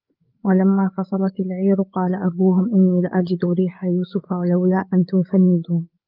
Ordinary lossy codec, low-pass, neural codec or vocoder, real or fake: Opus, 24 kbps; 5.4 kHz; codec, 16 kHz, 8 kbps, FreqCodec, larger model; fake